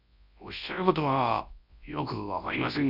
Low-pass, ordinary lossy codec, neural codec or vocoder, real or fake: 5.4 kHz; none; codec, 24 kHz, 0.9 kbps, WavTokenizer, large speech release; fake